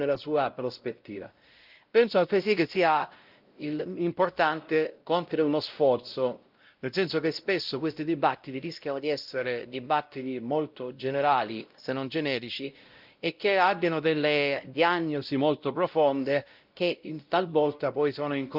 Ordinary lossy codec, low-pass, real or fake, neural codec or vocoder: Opus, 24 kbps; 5.4 kHz; fake; codec, 16 kHz, 0.5 kbps, X-Codec, WavLM features, trained on Multilingual LibriSpeech